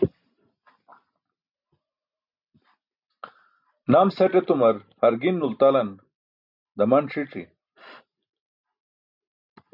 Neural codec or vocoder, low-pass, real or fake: none; 5.4 kHz; real